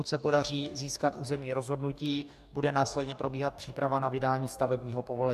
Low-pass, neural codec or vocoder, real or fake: 14.4 kHz; codec, 44.1 kHz, 2.6 kbps, DAC; fake